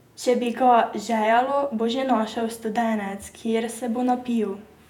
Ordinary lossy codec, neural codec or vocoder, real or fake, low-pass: none; vocoder, 48 kHz, 128 mel bands, Vocos; fake; 19.8 kHz